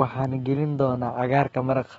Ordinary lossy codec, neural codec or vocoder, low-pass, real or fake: AAC, 24 kbps; autoencoder, 48 kHz, 128 numbers a frame, DAC-VAE, trained on Japanese speech; 19.8 kHz; fake